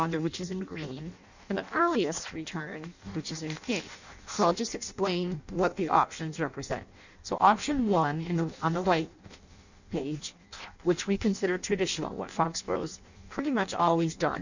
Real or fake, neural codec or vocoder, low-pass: fake; codec, 16 kHz in and 24 kHz out, 0.6 kbps, FireRedTTS-2 codec; 7.2 kHz